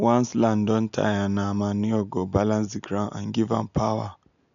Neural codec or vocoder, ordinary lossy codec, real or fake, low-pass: none; none; real; 7.2 kHz